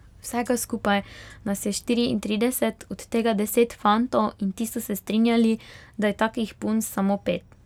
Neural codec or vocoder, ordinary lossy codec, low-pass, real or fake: none; none; 19.8 kHz; real